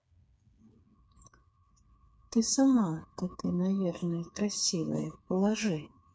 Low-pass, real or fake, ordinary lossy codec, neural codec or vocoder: none; fake; none; codec, 16 kHz, 4 kbps, FreqCodec, smaller model